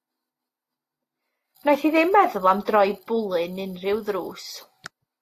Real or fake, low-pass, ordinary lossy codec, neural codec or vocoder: real; 14.4 kHz; AAC, 48 kbps; none